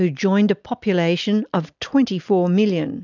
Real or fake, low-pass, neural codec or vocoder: real; 7.2 kHz; none